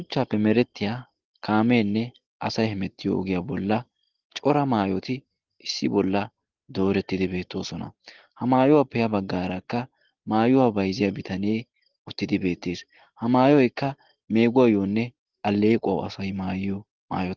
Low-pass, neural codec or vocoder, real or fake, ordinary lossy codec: 7.2 kHz; none; real; Opus, 16 kbps